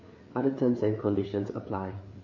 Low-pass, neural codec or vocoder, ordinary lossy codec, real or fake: 7.2 kHz; codec, 16 kHz, 4 kbps, FreqCodec, larger model; MP3, 32 kbps; fake